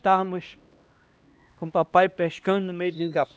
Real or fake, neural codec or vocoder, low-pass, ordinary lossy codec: fake; codec, 16 kHz, 1 kbps, X-Codec, HuBERT features, trained on LibriSpeech; none; none